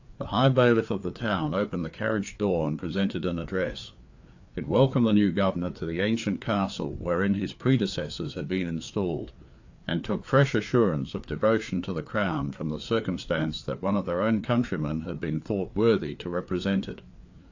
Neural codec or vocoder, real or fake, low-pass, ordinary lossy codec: codec, 16 kHz, 4 kbps, FreqCodec, larger model; fake; 7.2 kHz; AAC, 48 kbps